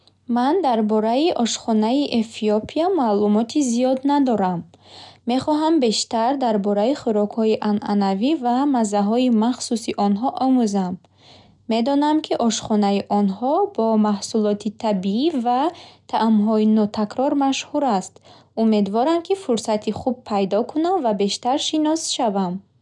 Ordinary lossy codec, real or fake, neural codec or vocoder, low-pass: none; real; none; 10.8 kHz